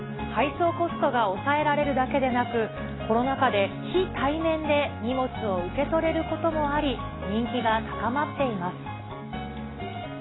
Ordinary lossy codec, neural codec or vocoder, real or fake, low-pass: AAC, 16 kbps; none; real; 7.2 kHz